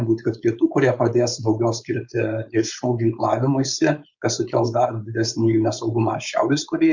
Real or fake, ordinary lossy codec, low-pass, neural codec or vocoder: fake; Opus, 64 kbps; 7.2 kHz; codec, 16 kHz, 4.8 kbps, FACodec